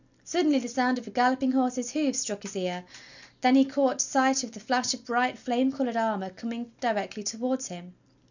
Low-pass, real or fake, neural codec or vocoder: 7.2 kHz; real; none